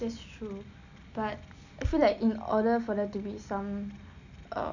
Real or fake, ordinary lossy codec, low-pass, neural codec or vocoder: real; none; 7.2 kHz; none